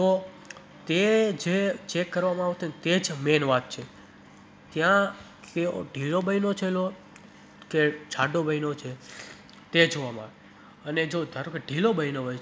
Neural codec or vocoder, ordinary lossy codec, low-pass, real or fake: none; none; none; real